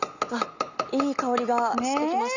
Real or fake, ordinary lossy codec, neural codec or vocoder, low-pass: real; none; none; 7.2 kHz